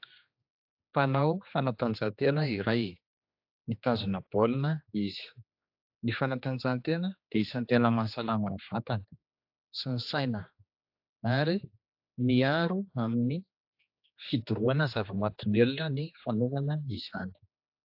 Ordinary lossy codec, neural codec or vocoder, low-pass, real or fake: AAC, 48 kbps; codec, 16 kHz, 2 kbps, X-Codec, HuBERT features, trained on general audio; 5.4 kHz; fake